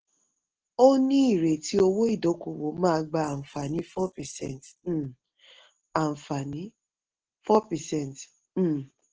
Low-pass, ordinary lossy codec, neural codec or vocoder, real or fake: 7.2 kHz; Opus, 16 kbps; none; real